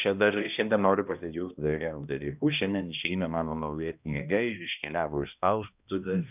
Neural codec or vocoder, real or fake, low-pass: codec, 16 kHz, 1 kbps, X-Codec, HuBERT features, trained on balanced general audio; fake; 3.6 kHz